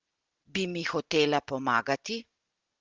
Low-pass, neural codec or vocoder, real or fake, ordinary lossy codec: 7.2 kHz; none; real; Opus, 16 kbps